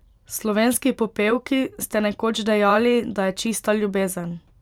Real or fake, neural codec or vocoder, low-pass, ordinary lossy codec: fake; vocoder, 44.1 kHz, 128 mel bands every 512 samples, BigVGAN v2; 19.8 kHz; none